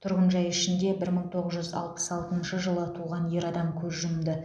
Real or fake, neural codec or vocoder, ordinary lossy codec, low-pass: real; none; none; none